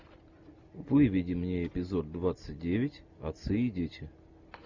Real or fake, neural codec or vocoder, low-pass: real; none; 7.2 kHz